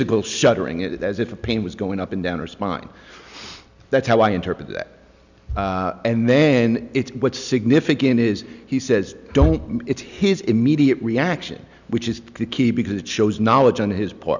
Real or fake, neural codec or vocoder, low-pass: real; none; 7.2 kHz